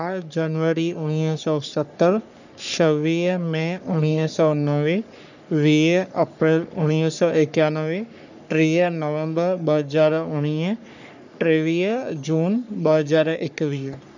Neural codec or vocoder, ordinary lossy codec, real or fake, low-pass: codec, 44.1 kHz, 3.4 kbps, Pupu-Codec; none; fake; 7.2 kHz